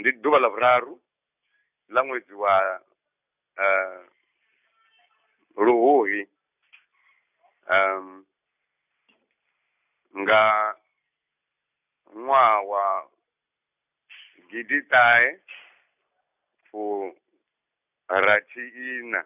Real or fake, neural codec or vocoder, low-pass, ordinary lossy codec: real; none; 3.6 kHz; none